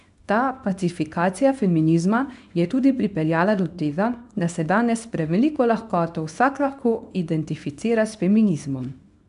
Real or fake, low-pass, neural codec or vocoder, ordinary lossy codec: fake; 10.8 kHz; codec, 24 kHz, 0.9 kbps, WavTokenizer, small release; none